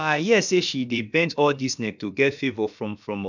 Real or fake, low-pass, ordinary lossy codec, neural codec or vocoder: fake; 7.2 kHz; none; codec, 16 kHz, about 1 kbps, DyCAST, with the encoder's durations